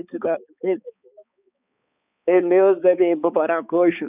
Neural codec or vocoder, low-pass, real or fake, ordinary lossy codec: codec, 16 kHz, 4 kbps, X-Codec, HuBERT features, trained on balanced general audio; 3.6 kHz; fake; none